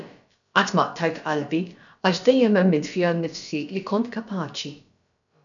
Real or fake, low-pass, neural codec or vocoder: fake; 7.2 kHz; codec, 16 kHz, about 1 kbps, DyCAST, with the encoder's durations